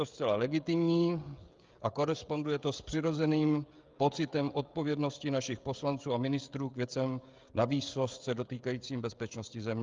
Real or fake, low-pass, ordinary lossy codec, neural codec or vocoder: fake; 7.2 kHz; Opus, 32 kbps; codec, 16 kHz, 16 kbps, FreqCodec, smaller model